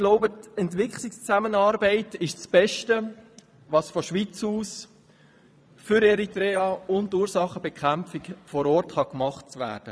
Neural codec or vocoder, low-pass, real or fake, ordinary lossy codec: vocoder, 22.05 kHz, 80 mel bands, Vocos; none; fake; none